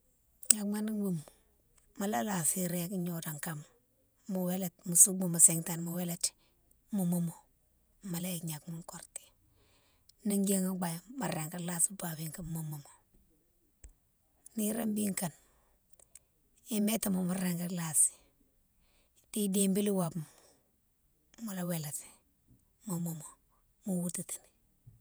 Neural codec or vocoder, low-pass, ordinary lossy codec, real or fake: vocoder, 48 kHz, 128 mel bands, Vocos; none; none; fake